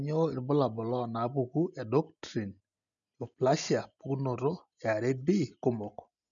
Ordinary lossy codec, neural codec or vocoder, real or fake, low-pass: none; none; real; 7.2 kHz